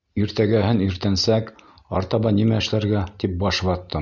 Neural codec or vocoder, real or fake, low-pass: none; real; 7.2 kHz